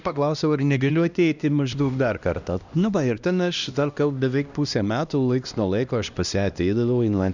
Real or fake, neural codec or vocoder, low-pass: fake; codec, 16 kHz, 1 kbps, X-Codec, HuBERT features, trained on LibriSpeech; 7.2 kHz